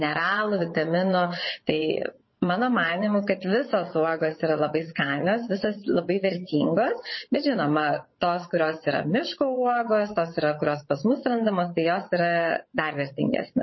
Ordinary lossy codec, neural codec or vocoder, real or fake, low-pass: MP3, 24 kbps; vocoder, 22.05 kHz, 80 mel bands, WaveNeXt; fake; 7.2 kHz